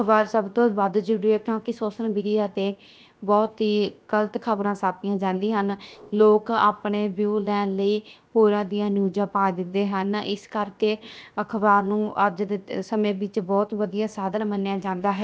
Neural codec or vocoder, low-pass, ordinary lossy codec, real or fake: codec, 16 kHz, 0.7 kbps, FocalCodec; none; none; fake